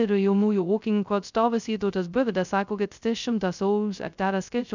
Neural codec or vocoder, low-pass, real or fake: codec, 16 kHz, 0.2 kbps, FocalCodec; 7.2 kHz; fake